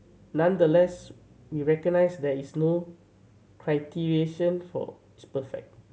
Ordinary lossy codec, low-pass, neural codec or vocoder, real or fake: none; none; none; real